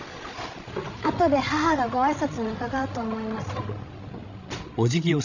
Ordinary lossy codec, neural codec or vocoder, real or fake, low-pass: none; codec, 16 kHz, 16 kbps, FreqCodec, larger model; fake; 7.2 kHz